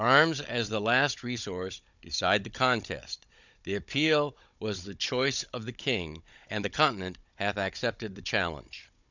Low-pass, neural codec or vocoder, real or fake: 7.2 kHz; codec, 16 kHz, 16 kbps, FunCodec, trained on Chinese and English, 50 frames a second; fake